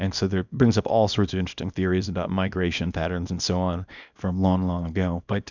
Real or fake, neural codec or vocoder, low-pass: fake; codec, 24 kHz, 0.9 kbps, WavTokenizer, small release; 7.2 kHz